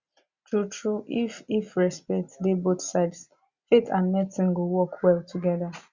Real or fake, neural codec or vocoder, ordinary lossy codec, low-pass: real; none; Opus, 64 kbps; 7.2 kHz